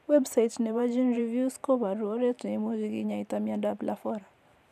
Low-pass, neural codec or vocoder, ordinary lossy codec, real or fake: 14.4 kHz; vocoder, 44.1 kHz, 128 mel bands every 512 samples, BigVGAN v2; none; fake